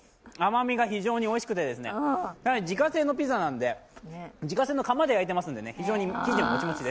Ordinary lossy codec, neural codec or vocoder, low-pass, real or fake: none; none; none; real